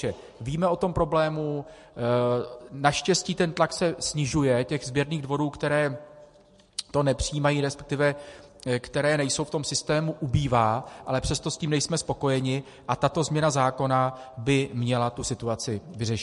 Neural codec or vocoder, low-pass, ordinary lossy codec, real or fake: none; 14.4 kHz; MP3, 48 kbps; real